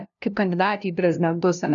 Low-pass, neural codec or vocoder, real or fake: 7.2 kHz; codec, 16 kHz, 0.5 kbps, FunCodec, trained on LibriTTS, 25 frames a second; fake